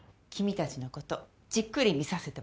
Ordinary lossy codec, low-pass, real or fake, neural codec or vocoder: none; none; real; none